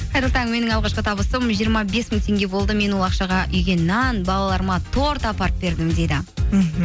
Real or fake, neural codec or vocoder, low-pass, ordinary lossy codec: real; none; none; none